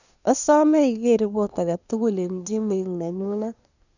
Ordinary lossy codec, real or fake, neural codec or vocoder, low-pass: none; fake; codec, 24 kHz, 1 kbps, SNAC; 7.2 kHz